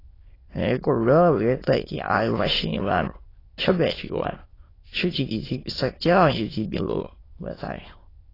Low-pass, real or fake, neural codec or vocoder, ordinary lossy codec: 5.4 kHz; fake; autoencoder, 22.05 kHz, a latent of 192 numbers a frame, VITS, trained on many speakers; AAC, 24 kbps